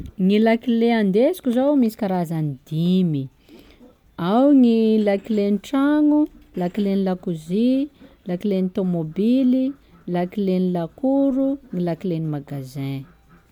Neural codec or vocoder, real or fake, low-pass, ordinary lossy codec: none; real; 19.8 kHz; MP3, 96 kbps